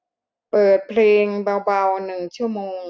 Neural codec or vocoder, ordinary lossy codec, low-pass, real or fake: none; none; none; real